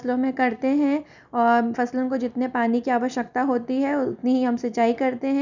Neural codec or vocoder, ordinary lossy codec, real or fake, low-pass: none; none; real; 7.2 kHz